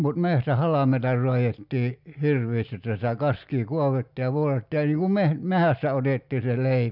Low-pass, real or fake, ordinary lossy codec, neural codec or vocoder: 5.4 kHz; real; none; none